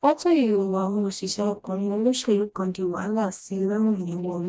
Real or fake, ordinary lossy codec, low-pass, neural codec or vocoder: fake; none; none; codec, 16 kHz, 1 kbps, FreqCodec, smaller model